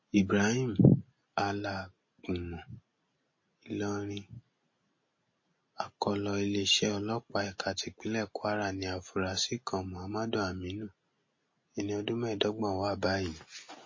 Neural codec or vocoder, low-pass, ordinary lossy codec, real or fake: none; 7.2 kHz; MP3, 32 kbps; real